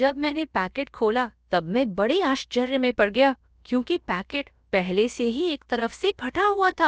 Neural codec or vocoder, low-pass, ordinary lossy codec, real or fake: codec, 16 kHz, about 1 kbps, DyCAST, with the encoder's durations; none; none; fake